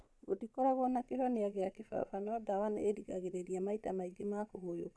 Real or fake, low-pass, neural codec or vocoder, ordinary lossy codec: fake; none; vocoder, 22.05 kHz, 80 mel bands, Vocos; none